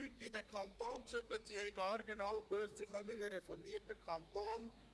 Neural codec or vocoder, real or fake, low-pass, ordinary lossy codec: codec, 24 kHz, 1 kbps, SNAC; fake; none; none